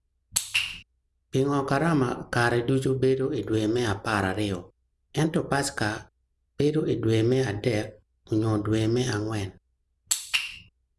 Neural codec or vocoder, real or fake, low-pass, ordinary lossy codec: none; real; none; none